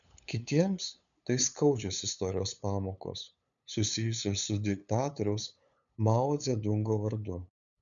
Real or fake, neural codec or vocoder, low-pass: fake; codec, 16 kHz, 8 kbps, FunCodec, trained on LibriTTS, 25 frames a second; 7.2 kHz